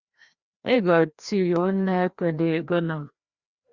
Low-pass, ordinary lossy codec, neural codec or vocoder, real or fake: 7.2 kHz; Opus, 64 kbps; codec, 16 kHz, 1 kbps, FreqCodec, larger model; fake